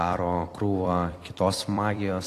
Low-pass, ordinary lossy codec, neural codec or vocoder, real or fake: 14.4 kHz; AAC, 48 kbps; vocoder, 44.1 kHz, 128 mel bands every 256 samples, BigVGAN v2; fake